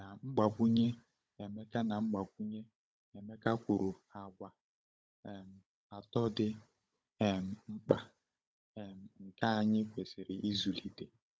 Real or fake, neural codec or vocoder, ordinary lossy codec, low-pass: fake; codec, 16 kHz, 16 kbps, FunCodec, trained on Chinese and English, 50 frames a second; none; none